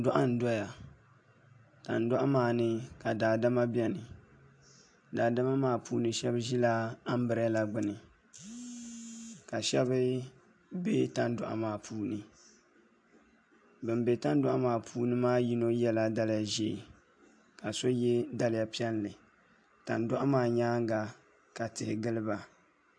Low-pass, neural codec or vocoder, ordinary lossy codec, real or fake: 9.9 kHz; none; AAC, 64 kbps; real